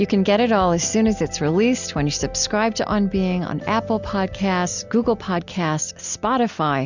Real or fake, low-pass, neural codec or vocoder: real; 7.2 kHz; none